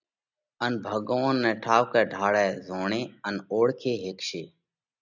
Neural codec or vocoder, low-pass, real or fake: none; 7.2 kHz; real